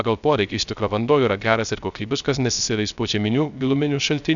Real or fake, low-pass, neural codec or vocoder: fake; 7.2 kHz; codec, 16 kHz, 0.3 kbps, FocalCodec